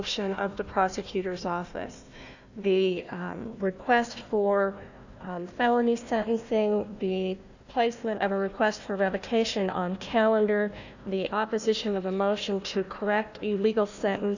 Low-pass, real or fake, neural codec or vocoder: 7.2 kHz; fake; codec, 16 kHz, 1 kbps, FunCodec, trained on Chinese and English, 50 frames a second